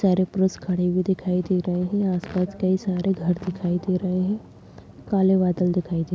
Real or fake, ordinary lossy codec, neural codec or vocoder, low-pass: real; none; none; none